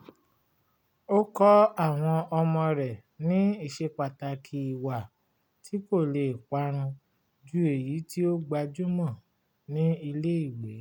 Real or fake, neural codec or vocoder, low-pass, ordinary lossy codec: real; none; 19.8 kHz; none